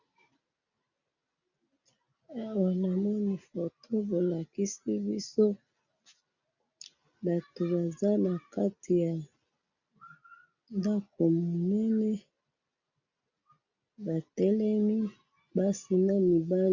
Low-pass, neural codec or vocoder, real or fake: 7.2 kHz; none; real